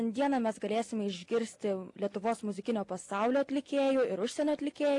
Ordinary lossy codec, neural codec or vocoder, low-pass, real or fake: AAC, 48 kbps; none; 10.8 kHz; real